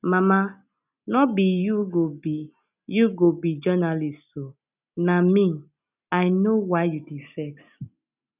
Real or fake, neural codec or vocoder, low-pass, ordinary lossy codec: real; none; 3.6 kHz; none